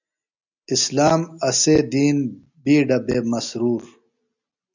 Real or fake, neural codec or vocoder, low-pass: real; none; 7.2 kHz